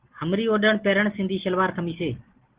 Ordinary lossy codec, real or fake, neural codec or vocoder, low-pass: Opus, 16 kbps; real; none; 3.6 kHz